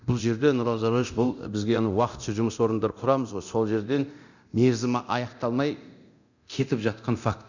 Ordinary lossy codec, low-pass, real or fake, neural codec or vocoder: none; 7.2 kHz; fake; codec, 24 kHz, 0.9 kbps, DualCodec